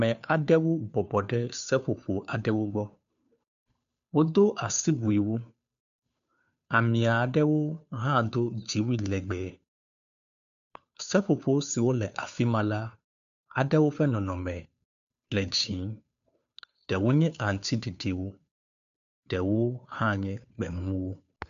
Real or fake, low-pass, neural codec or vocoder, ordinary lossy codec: fake; 7.2 kHz; codec, 16 kHz, 2 kbps, FunCodec, trained on Chinese and English, 25 frames a second; MP3, 96 kbps